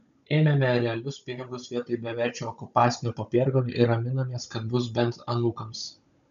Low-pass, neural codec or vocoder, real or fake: 7.2 kHz; codec, 16 kHz, 16 kbps, FunCodec, trained on Chinese and English, 50 frames a second; fake